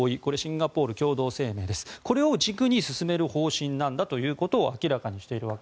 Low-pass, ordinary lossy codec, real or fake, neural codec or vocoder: none; none; real; none